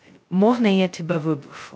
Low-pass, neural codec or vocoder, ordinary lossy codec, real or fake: none; codec, 16 kHz, 0.2 kbps, FocalCodec; none; fake